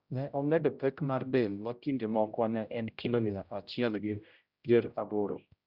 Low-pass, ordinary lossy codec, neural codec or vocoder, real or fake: 5.4 kHz; Opus, 64 kbps; codec, 16 kHz, 0.5 kbps, X-Codec, HuBERT features, trained on general audio; fake